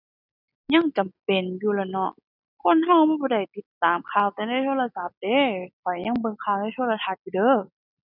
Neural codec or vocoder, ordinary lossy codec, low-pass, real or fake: none; none; 5.4 kHz; real